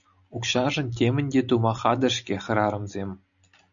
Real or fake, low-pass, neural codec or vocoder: real; 7.2 kHz; none